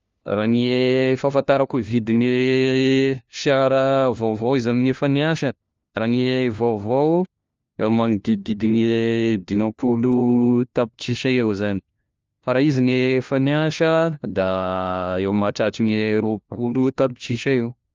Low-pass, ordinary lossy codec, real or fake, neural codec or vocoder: 7.2 kHz; Opus, 32 kbps; fake; codec, 16 kHz, 1 kbps, FunCodec, trained on LibriTTS, 50 frames a second